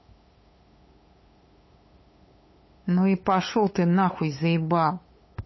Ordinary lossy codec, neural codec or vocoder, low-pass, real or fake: MP3, 24 kbps; codec, 16 kHz, 8 kbps, FunCodec, trained on LibriTTS, 25 frames a second; 7.2 kHz; fake